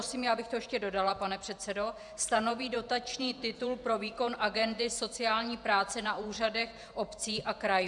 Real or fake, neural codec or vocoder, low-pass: fake; vocoder, 48 kHz, 128 mel bands, Vocos; 10.8 kHz